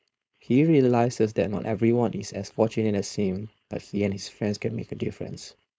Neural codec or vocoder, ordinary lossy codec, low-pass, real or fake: codec, 16 kHz, 4.8 kbps, FACodec; none; none; fake